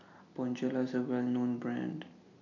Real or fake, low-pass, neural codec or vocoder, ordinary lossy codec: real; 7.2 kHz; none; AAC, 48 kbps